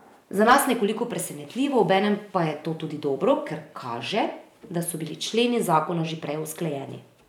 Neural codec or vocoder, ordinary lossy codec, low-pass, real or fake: vocoder, 44.1 kHz, 128 mel bands every 512 samples, BigVGAN v2; none; 19.8 kHz; fake